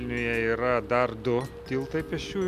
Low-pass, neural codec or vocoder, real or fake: 14.4 kHz; none; real